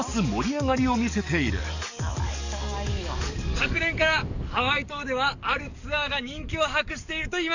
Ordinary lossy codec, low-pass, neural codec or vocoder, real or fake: none; 7.2 kHz; codec, 44.1 kHz, 7.8 kbps, DAC; fake